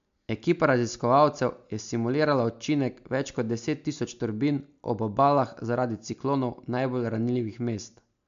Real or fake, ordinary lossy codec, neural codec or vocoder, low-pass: real; MP3, 64 kbps; none; 7.2 kHz